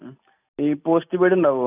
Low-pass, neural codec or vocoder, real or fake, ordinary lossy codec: 3.6 kHz; none; real; none